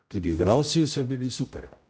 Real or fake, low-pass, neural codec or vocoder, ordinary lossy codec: fake; none; codec, 16 kHz, 0.5 kbps, X-Codec, HuBERT features, trained on general audio; none